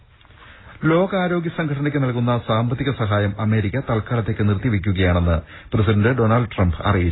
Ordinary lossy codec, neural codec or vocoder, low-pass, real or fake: AAC, 16 kbps; none; 7.2 kHz; real